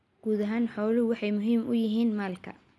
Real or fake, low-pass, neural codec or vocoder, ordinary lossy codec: real; none; none; none